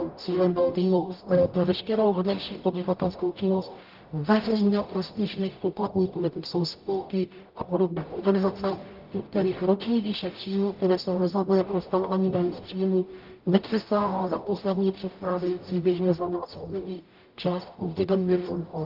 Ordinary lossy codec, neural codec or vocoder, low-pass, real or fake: Opus, 32 kbps; codec, 44.1 kHz, 0.9 kbps, DAC; 5.4 kHz; fake